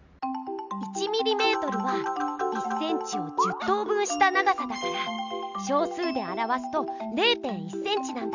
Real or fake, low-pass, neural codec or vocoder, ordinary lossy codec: real; 7.2 kHz; none; none